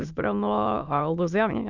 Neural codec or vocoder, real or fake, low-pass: autoencoder, 22.05 kHz, a latent of 192 numbers a frame, VITS, trained on many speakers; fake; 7.2 kHz